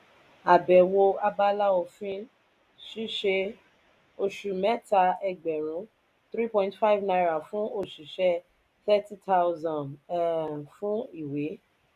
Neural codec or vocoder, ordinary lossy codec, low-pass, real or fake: none; none; 14.4 kHz; real